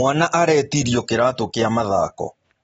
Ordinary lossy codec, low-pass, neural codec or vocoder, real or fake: AAC, 24 kbps; 19.8 kHz; none; real